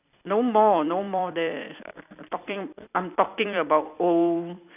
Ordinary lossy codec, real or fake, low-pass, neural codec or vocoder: none; fake; 3.6 kHz; vocoder, 44.1 kHz, 128 mel bands every 256 samples, BigVGAN v2